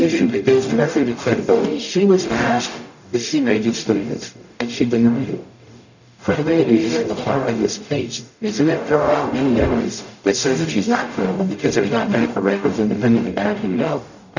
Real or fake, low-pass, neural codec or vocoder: fake; 7.2 kHz; codec, 44.1 kHz, 0.9 kbps, DAC